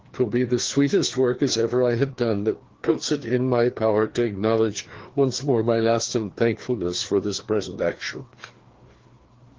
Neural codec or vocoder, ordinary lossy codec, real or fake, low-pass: codec, 16 kHz, 2 kbps, FreqCodec, larger model; Opus, 16 kbps; fake; 7.2 kHz